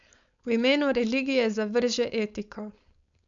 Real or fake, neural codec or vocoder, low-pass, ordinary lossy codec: fake; codec, 16 kHz, 4.8 kbps, FACodec; 7.2 kHz; none